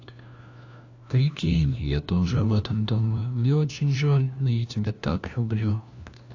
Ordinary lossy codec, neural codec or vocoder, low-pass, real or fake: AAC, 48 kbps; codec, 16 kHz, 1 kbps, FunCodec, trained on LibriTTS, 50 frames a second; 7.2 kHz; fake